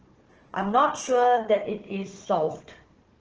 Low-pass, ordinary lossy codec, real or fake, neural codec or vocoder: 7.2 kHz; Opus, 16 kbps; fake; codec, 16 kHz, 4 kbps, FunCodec, trained on Chinese and English, 50 frames a second